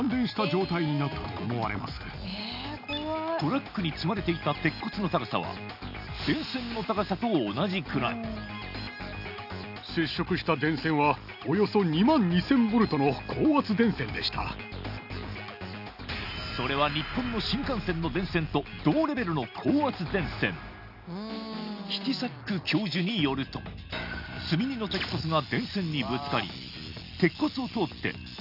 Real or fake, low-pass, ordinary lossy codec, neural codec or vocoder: real; 5.4 kHz; none; none